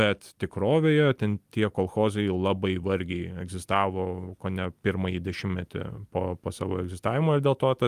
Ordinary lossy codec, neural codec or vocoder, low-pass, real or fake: Opus, 32 kbps; none; 14.4 kHz; real